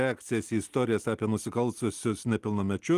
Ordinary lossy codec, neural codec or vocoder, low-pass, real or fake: Opus, 24 kbps; none; 14.4 kHz; real